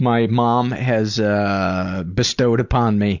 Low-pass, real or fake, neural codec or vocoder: 7.2 kHz; real; none